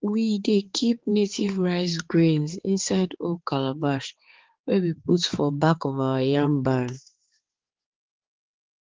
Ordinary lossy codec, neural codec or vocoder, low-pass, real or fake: Opus, 16 kbps; codec, 16 kHz, 4 kbps, X-Codec, HuBERT features, trained on balanced general audio; 7.2 kHz; fake